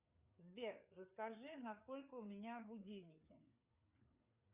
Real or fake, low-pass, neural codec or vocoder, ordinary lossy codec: fake; 3.6 kHz; codec, 16 kHz, 4 kbps, FreqCodec, larger model; Opus, 64 kbps